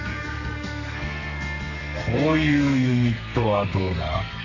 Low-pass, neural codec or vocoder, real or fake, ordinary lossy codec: 7.2 kHz; codec, 32 kHz, 1.9 kbps, SNAC; fake; none